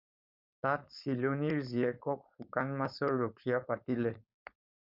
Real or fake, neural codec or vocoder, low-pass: fake; codec, 16 kHz, 4.8 kbps, FACodec; 5.4 kHz